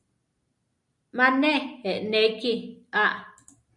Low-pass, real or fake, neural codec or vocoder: 10.8 kHz; real; none